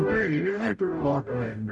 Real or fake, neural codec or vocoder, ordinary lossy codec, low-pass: fake; codec, 44.1 kHz, 0.9 kbps, DAC; none; 10.8 kHz